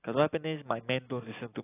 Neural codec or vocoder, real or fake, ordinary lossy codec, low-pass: none; real; AAC, 16 kbps; 3.6 kHz